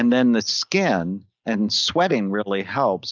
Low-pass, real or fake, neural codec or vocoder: 7.2 kHz; real; none